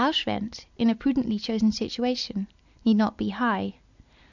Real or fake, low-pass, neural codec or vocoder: real; 7.2 kHz; none